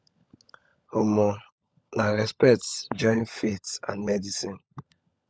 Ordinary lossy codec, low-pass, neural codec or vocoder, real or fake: none; none; codec, 16 kHz, 16 kbps, FunCodec, trained on LibriTTS, 50 frames a second; fake